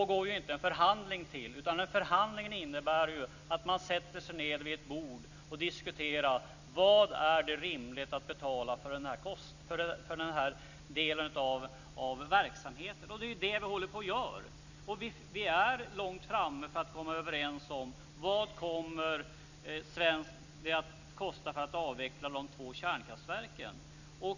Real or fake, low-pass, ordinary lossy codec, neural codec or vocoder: real; 7.2 kHz; none; none